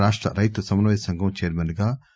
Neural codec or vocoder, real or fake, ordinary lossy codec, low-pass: none; real; none; none